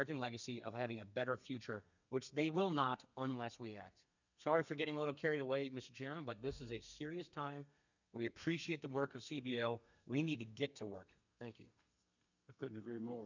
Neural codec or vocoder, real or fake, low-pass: codec, 32 kHz, 1.9 kbps, SNAC; fake; 7.2 kHz